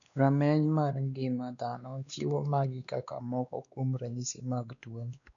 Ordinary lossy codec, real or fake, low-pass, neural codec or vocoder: none; fake; 7.2 kHz; codec, 16 kHz, 2 kbps, X-Codec, WavLM features, trained on Multilingual LibriSpeech